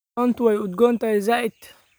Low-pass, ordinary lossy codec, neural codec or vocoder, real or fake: none; none; none; real